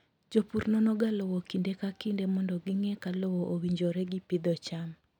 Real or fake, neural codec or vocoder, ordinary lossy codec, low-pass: real; none; none; 19.8 kHz